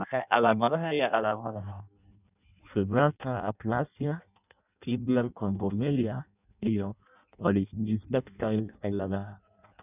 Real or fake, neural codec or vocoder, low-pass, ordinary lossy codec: fake; codec, 16 kHz in and 24 kHz out, 0.6 kbps, FireRedTTS-2 codec; 3.6 kHz; none